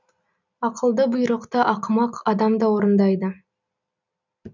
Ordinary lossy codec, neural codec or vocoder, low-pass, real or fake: none; none; 7.2 kHz; real